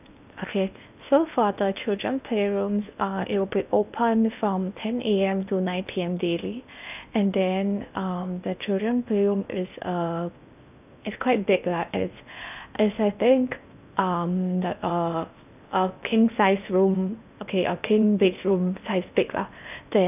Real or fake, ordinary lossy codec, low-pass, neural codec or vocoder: fake; none; 3.6 kHz; codec, 16 kHz in and 24 kHz out, 0.8 kbps, FocalCodec, streaming, 65536 codes